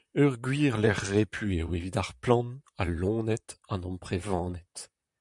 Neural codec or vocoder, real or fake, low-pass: vocoder, 44.1 kHz, 128 mel bands, Pupu-Vocoder; fake; 10.8 kHz